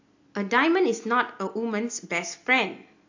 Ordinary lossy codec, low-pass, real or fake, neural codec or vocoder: AAC, 48 kbps; 7.2 kHz; real; none